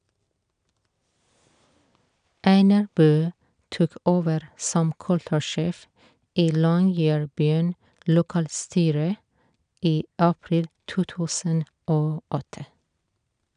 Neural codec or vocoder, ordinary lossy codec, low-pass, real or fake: none; none; 9.9 kHz; real